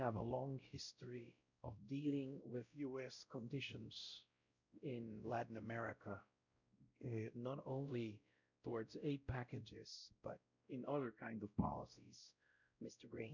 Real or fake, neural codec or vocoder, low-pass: fake; codec, 16 kHz, 0.5 kbps, X-Codec, WavLM features, trained on Multilingual LibriSpeech; 7.2 kHz